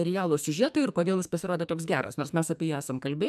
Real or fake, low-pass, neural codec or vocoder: fake; 14.4 kHz; codec, 44.1 kHz, 2.6 kbps, SNAC